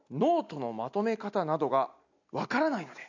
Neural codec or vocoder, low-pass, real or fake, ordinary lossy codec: none; 7.2 kHz; real; none